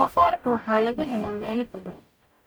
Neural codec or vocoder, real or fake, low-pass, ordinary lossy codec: codec, 44.1 kHz, 0.9 kbps, DAC; fake; none; none